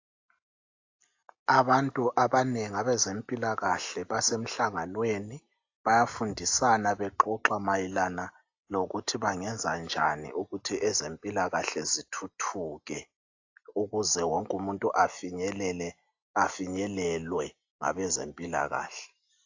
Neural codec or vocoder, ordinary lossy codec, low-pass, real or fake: none; AAC, 48 kbps; 7.2 kHz; real